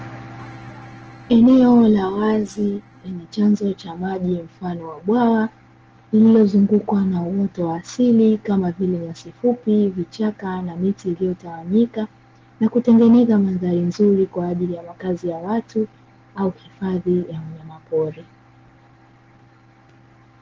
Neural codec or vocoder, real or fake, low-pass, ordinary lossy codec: none; real; 7.2 kHz; Opus, 16 kbps